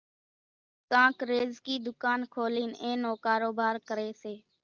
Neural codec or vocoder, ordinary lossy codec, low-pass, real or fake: none; Opus, 24 kbps; 7.2 kHz; real